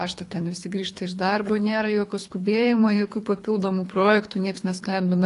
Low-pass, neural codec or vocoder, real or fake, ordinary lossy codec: 10.8 kHz; codec, 24 kHz, 3 kbps, HILCodec; fake; AAC, 48 kbps